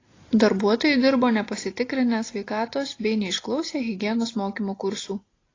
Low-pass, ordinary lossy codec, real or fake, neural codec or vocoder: 7.2 kHz; AAC, 32 kbps; real; none